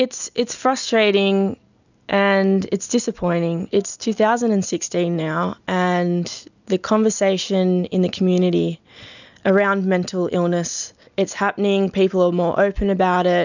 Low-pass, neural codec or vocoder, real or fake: 7.2 kHz; none; real